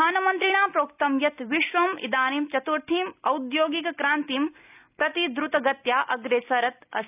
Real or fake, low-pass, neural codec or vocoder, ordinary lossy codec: real; 3.6 kHz; none; none